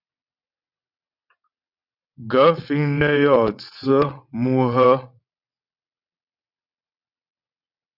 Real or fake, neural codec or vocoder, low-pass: fake; vocoder, 22.05 kHz, 80 mel bands, WaveNeXt; 5.4 kHz